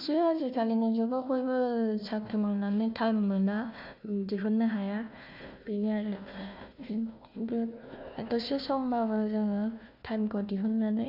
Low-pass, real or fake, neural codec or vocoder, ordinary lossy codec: 5.4 kHz; fake; codec, 16 kHz, 1 kbps, FunCodec, trained on Chinese and English, 50 frames a second; none